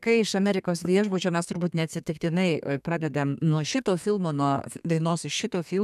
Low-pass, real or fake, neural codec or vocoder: 14.4 kHz; fake; codec, 32 kHz, 1.9 kbps, SNAC